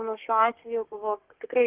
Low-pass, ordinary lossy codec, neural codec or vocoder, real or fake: 3.6 kHz; Opus, 16 kbps; codec, 32 kHz, 1.9 kbps, SNAC; fake